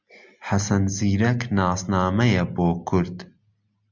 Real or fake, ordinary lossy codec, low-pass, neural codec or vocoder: real; MP3, 64 kbps; 7.2 kHz; none